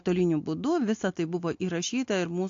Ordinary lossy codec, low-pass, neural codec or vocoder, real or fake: AAC, 48 kbps; 7.2 kHz; none; real